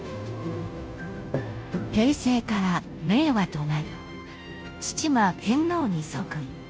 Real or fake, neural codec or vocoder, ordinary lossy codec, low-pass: fake; codec, 16 kHz, 0.5 kbps, FunCodec, trained on Chinese and English, 25 frames a second; none; none